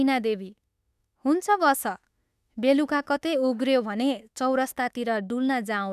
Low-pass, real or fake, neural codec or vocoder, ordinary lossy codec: 14.4 kHz; fake; autoencoder, 48 kHz, 32 numbers a frame, DAC-VAE, trained on Japanese speech; none